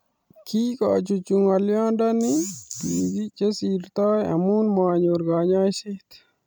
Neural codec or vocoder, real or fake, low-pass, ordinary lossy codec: vocoder, 44.1 kHz, 128 mel bands every 256 samples, BigVGAN v2; fake; none; none